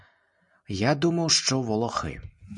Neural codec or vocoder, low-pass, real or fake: none; 10.8 kHz; real